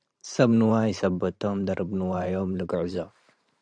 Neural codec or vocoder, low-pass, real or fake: vocoder, 44.1 kHz, 128 mel bands every 512 samples, BigVGAN v2; 9.9 kHz; fake